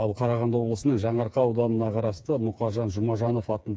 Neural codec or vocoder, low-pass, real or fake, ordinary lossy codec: codec, 16 kHz, 4 kbps, FreqCodec, smaller model; none; fake; none